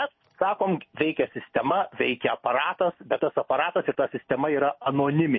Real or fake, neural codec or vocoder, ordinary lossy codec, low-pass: real; none; MP3, 24 kbps; 7.2 kHz